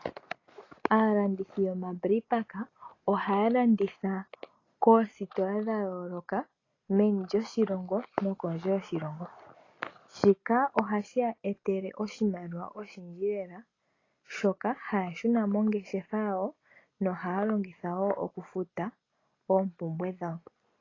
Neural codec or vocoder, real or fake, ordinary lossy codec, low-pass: none; real; AAC, 32 kbps; 7.2 kHz